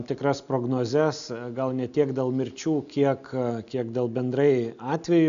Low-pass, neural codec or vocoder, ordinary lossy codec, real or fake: 7.2 kHz; none; AAC, 96 kbps; real